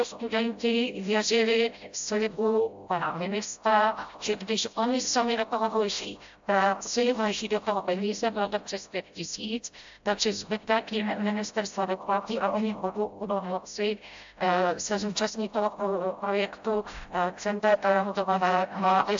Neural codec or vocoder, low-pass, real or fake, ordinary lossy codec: codec, 16 kHz, 0.5 kbps, FreqCodec, smaller model; 7.2 kHz; fake; AAC, 64 kbps